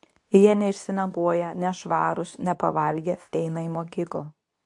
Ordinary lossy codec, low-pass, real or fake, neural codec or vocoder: AAC, 64 kbps; 10.8 kHz; fake; codec, 24 kHz, 0.9 kbps, WavTokenizer, medium speech release version 2